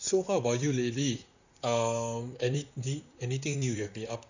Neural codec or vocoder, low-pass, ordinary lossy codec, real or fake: vocoder, 44.1 kHz, 128 mel bands, Pupu-Vocoder; 7.2 kHz; none; fake